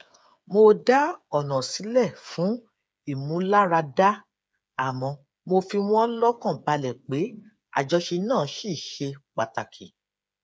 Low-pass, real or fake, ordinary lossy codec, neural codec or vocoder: none; fake; none; codec, 16 kHz, 8 kbps, FreqCodec, smaller model